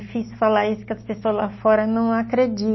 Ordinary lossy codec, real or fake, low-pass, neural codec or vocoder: MP3, 24 kbps; real; 7.2 kHz; none